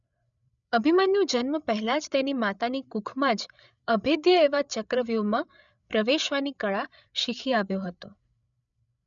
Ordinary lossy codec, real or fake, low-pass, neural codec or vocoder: none; fake; 7.2 kHz; codec, 16 kHz, 8 kbps, FreqCodec, larger model